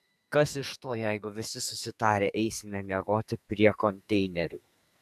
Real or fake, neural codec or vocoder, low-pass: fake; codec, 44.1 kHz, 2.6 kbps, SNAC; 14.4 kHz